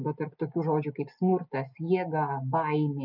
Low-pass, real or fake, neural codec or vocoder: 5.4 kHz; real; none